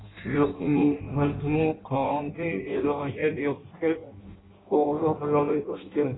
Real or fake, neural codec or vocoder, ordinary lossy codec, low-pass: fake; codec, 16 kHz in and 24 kHz out, 0.6 kbps, FireRedTTS-2 codec; AAC, 16 kbps; 7.2 kHz